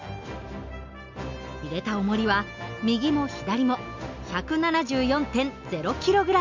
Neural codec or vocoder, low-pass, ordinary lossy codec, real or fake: none; 7.2 kHz; MP3, 64 kbps; real